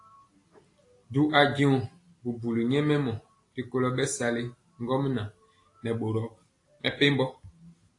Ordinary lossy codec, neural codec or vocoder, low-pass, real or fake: AAC, 48 kbps; none; 10.8 kHz; real